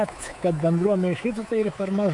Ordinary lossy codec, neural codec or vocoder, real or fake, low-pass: AAC, 64 kbps; codec, 24 kHz, 3.1 kbps, DualCodec; fake; 10.8 kHz